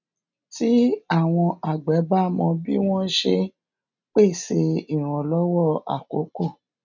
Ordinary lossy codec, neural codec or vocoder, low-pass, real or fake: none; none; 7.2 kHz; real